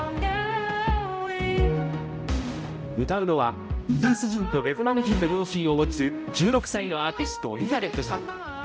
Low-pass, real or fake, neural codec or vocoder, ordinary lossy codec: none; fake; codec, 16 kHz, 0.5 kbps, X-Codec, HuBERT features, trained on balanced general audio; none